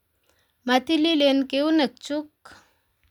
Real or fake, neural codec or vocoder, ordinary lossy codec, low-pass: real; none; none; 19.8 kHz